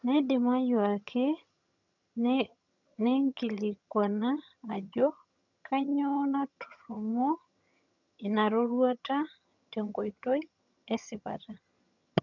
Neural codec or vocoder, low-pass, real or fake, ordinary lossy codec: vocoder, 22.05 kHz, 80 mel bands, HiFi-GAN; 7.2 kHz; fake; none